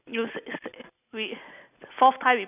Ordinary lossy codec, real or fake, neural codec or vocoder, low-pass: none; real; none; 3.6 kHz